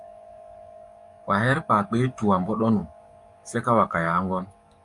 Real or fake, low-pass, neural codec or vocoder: fake; 10.8 kHz; codec, 44.1 kHz, 7.8 kbps, DAC